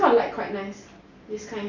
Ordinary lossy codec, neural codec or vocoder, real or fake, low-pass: none; none; real; 7.2 kHz